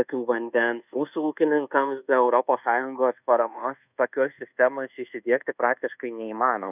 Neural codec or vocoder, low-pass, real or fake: codec, 24 kHz, 1.2 kbps, DualCodec; 3.6 kHz; fake